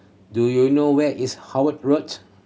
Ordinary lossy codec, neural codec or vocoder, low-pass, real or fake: none; none; none; real